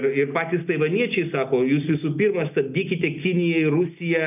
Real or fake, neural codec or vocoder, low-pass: real; none; 3.6 kHz